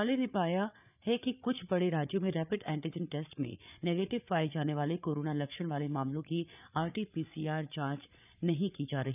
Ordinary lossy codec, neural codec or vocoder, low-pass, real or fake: none; codec, 16 kHz, 8 kbps, FreqCodec, larger model; 3.6 kHz; fake